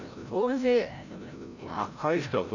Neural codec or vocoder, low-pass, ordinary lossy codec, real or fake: codec, 16 kHz, 0.5 kbps, FreqCodec, larger model; 7.2 kHz; none; fake